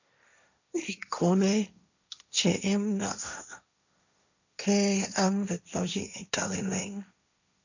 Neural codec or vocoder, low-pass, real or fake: codec, 16 kHz, 1.1 kbps, Voila-Tokenizer; 7.2 kHz; fake